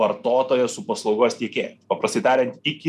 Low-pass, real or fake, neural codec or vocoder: 14.4 kHz; real; none